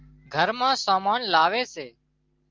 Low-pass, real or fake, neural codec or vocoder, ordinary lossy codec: 7.2 kHz; real; none; Opus, 32 kbps